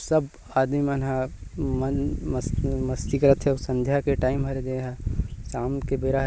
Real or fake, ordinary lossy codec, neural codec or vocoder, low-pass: real; none; none; none